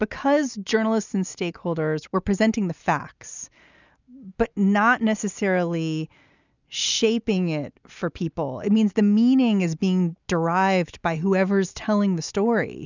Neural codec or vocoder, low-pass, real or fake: none; 7.2 kHz; real